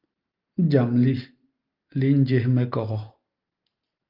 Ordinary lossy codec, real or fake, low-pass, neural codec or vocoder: Opus, 32 kbps; real; 5.4 kHz; none